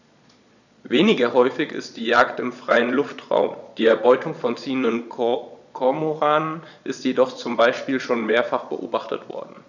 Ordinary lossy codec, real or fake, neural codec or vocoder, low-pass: none; fake; vocoder, 22.05 kHz, 80 mel bands, Vocos; 7.2 kHz